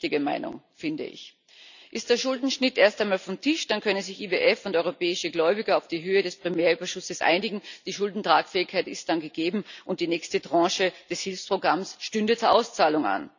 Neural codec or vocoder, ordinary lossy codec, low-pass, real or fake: none; none; 7.2 kHz; real